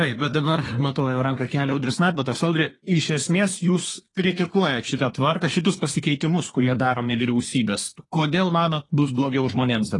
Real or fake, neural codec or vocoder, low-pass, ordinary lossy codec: fake; codec, 24 kHz, 1 kbps, SNAC; 10.8 kHz; AAC, 32 kbps